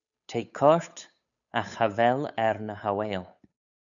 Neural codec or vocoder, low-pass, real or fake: codec, 16 kHz, 8 kbps, FunCodec, trained on Chinese and English, 25 frames a second; 7.2 kHz; fake